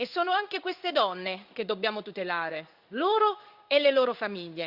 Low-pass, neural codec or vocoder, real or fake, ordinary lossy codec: 5.4 kHz; codec, 16 kHz in and 24 kHz out, 1 kbps, XY-Tokenizer; fake; Opus, 64 kbps